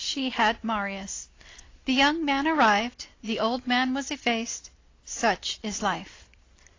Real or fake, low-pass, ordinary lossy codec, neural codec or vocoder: real; 7.2 kHz; AAC, 32 kbps; none